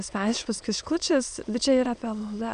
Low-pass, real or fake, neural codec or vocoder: 9.9 kHz; fake; autoencoder, 22.05 kHz, a latent of 192 numbers a frame, VITS, trained on many speakers